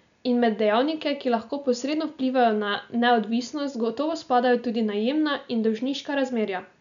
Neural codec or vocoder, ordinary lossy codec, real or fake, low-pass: none; none; real; 7.2 kHz